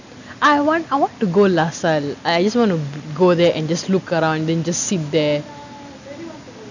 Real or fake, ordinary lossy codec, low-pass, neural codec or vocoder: real; none; 7.2 kHz; none